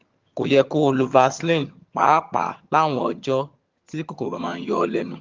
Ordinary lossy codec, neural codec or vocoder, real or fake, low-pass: Opus, 32 kbps; vocoder, 22.05 kHz, 80 mel bands, HiFi-GAN; fake; 7.2 kHz